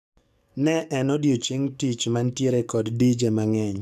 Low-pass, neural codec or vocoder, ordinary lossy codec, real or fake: 14.4 kHz; codec, 44.1 kHz, 7.8 kbps, DAC; none; fake